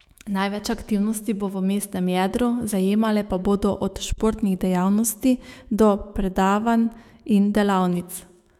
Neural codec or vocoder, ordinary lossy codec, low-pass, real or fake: autoencoder, 48 kHz, 128 numbers a frame, DAC-VAE, trained on Japanese speech; none; 19.8 kHz; fake